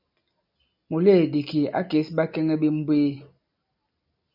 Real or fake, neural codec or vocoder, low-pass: real; none; 5.4 kHz